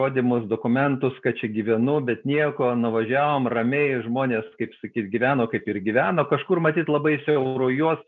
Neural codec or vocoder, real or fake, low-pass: none; real; 7.2 kHz